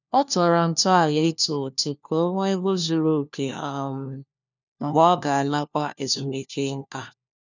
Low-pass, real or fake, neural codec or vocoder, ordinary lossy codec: 7.2 kHz; fake; codec, 16 kHz, 1 kbps, FunCodec, trained on LibriTTS, 50 frames a second; none